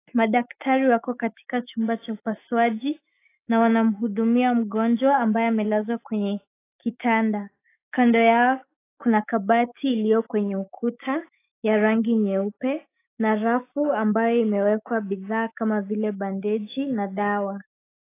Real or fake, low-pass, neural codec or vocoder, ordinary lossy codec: fake; 3.6 kHz; autoencoder, 48 kHz, 128 numbers a frame, DAC-VAE, trained on Japanese speech; AAC, 24 kbps